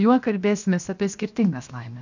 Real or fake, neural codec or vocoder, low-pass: fake; codec, 16 kHz, 0.7 kbps, FocalCodec; 7.2 kHz